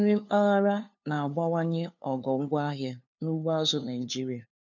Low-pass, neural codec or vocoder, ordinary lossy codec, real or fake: 7.2 kHz; codec, 16 kHz, 2 kbps, FunCodec, trained on LibriTTS, 25 frames a second; none; fake